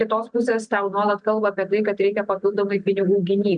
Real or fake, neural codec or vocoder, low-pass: real; none; 9.9 kHz